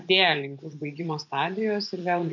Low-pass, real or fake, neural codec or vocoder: 7.2 kHz; fake; vocoder, 24 kHz, 100 mel bands, Vocos